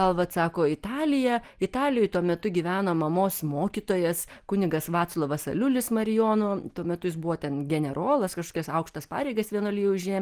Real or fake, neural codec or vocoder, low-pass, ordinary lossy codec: real; none; 14.4 kHz; Opus, 24 kbps